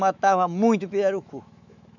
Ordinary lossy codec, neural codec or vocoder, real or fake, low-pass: none; autoencoder, 48 kHz, 128 numbers a frame, DAC-VAE, trained on Japanese speech; fake; 7.2 kHz